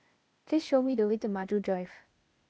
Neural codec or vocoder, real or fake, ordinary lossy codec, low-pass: codec, 16 kHz, 0.8 kbps, ZipCodec; fake; none; none